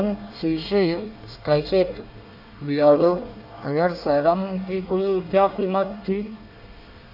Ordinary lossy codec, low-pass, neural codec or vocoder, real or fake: none; 5.4 kHz; codec, 24 kHz, 1 kbps, SNAC; fake